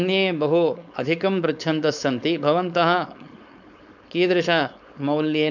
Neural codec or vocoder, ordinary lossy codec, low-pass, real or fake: codec, 16 kHz, 4.8 kbps, FACodec; none; 7.2 kHz; fake